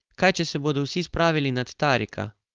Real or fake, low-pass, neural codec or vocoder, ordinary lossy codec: fake; 7.2 kHz; codec, 16 kHz, 4.8 kbps, FACodec; Opus, 32 kbps